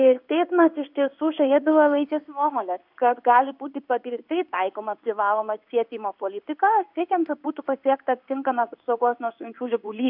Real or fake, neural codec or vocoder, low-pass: fake; codec, 16 kHz in and 24 kHz out, 1 kbps, XY-Tokenizer; 5.4 kHz